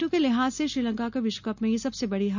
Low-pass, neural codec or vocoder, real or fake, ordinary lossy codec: 7.2 kHz; none; real; none